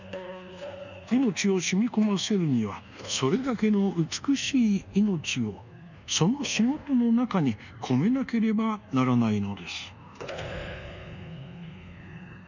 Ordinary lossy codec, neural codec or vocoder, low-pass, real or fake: none; codec, 24 kHz, 1.2 kbps, DualCodec; 7.2 kHz; fake